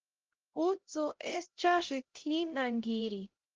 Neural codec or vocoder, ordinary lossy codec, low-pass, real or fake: codec, 16 kHz, 0.5 kbps, X-Codec, HuBERT features, trained on LibriSpeech; Opus, 16 kbps; 7.2 kHz; fake